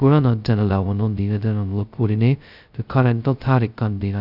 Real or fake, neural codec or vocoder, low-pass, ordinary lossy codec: fake; codec, 16 kHz, 0.2 kbps, FocalCodec; 5.4 kHz; none